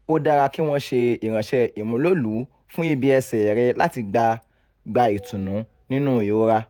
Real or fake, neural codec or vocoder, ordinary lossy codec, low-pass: fake; vocoder, 48 kHz, 128 mel bands, Vocos; none; none